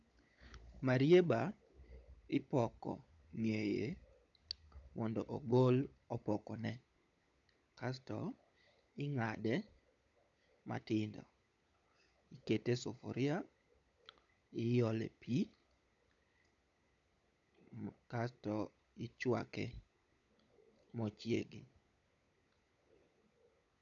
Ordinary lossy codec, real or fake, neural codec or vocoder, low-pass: none; fake; codec, 16 kHz, 16 kbps, FunCodec, trained on LibriTTS, 50 frames a second; 7.2 kHz